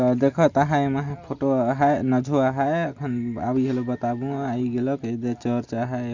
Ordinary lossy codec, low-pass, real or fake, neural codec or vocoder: Opus, 64 kbps; 7.2 kHz; real; none